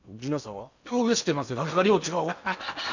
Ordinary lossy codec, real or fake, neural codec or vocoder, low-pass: none; fake; codec, 16 kHz in and 24 kHz out, 0.8 kbps, FocalCodec, streaming, 65536 codes; 7.2 kHz